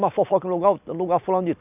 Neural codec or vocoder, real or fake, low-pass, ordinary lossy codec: none; real; 3.6 kHz; none